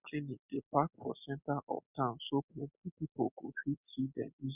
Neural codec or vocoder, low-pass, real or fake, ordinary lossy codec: none; 3.6 kHz; real; none